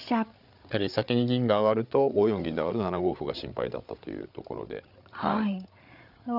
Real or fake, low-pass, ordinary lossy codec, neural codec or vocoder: fake; 5.4 kHz; none; codec, 16 kHz, 8 kbps, FreqCodec, larger model